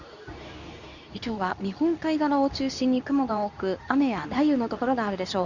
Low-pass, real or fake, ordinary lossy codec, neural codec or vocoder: 7.2 kHz; fake; none; codec, 24 kHz, 0.9 kbps, WavTokenizer, medium speech release version 2